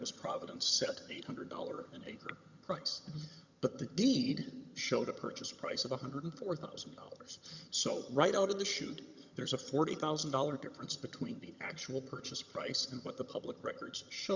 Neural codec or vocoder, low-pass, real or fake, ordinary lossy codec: vocoder, 22.05 kHz, 80 mel bands, HiFi-GAN; 7.2 kHz; fake; Opus, 64 kbps